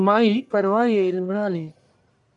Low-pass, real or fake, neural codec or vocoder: 10.8 kHz; fake; codec, 44.1 kHz, 1.7 kbps, Pupu-Codec